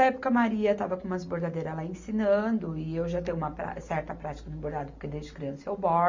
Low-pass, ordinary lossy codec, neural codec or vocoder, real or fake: 7.2 kHz; MP3, 48 kbps; none; real